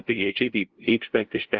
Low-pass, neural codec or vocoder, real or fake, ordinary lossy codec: 7.2 kHz; codec, 16 kHz, 0.5 kbps, FunCodec, trained on LibriTTS, 25 frames a second; fake; Opus, 16 kbps